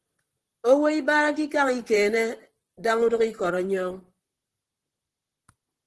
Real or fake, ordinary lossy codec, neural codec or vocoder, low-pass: fake; Opus, 16 kbps; vocoder, 44.1 kHz, 128 mel bands, Pupu-Vocoder; 10.8 kHz